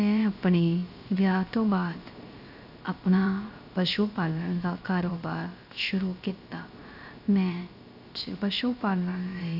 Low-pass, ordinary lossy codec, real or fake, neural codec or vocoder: 5.4 kHz; none; fake; codec, 16 kHz, 0.3 kbps, FocalCodec